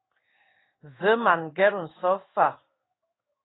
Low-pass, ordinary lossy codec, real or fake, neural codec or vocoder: 7.2 kHz; AAC, 16 kbps; fake; codec, 16 kHz in and 24 kHz out, 1 kbps, XY-Tokenizer